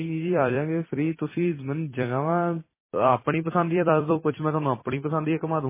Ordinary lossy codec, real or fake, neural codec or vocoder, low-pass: MP3, 16 kbps; fake; vocoder, 44.1 kHz, 128 mel bands every 256 samples, BigVGAN v2; 3.6 kHz